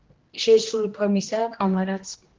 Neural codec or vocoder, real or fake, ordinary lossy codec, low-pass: codec, 16 kHz, 1 kbps, X-Codec, HuBERT features, trained on general audio; fake; Opus, 16 kbps; 7.2 kHz